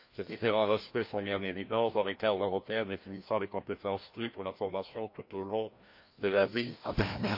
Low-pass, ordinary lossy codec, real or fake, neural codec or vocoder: 5.4 kHz; MP3, 32 kbps; fake; codec, 16 kHz, 1 kbps, FreqCodec, larger model